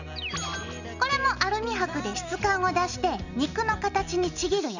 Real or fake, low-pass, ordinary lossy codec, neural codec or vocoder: real; 7.2 kHz; none; none